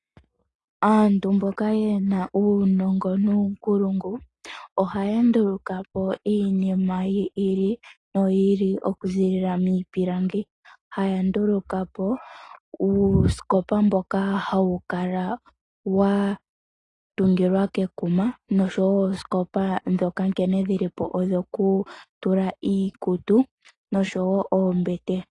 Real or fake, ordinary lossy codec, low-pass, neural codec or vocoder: real; AAC, 32 kbps; 10.8 kHz; none